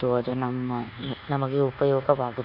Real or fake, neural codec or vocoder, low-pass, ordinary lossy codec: fake; codec, 24 kHz, 1.2 kbps, DualCodec; 5.4 kHz; AAC, 48 kbps